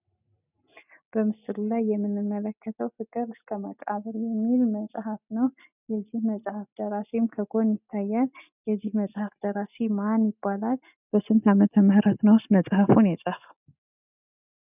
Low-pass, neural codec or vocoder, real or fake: 3.6 kHz; none; real